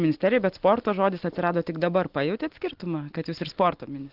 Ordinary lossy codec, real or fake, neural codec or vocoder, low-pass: Opus, 16 kbps; real; none; 5.4 kHz